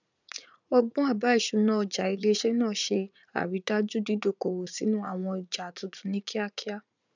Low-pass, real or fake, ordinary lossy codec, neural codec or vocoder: 7.2 kHz; fake; none; vocoder, 44.1 kHz, 80 mel bands, Vocos